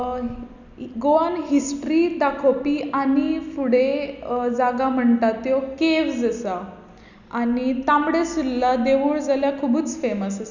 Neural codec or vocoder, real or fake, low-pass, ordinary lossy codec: none; real; 7.2 kHz; none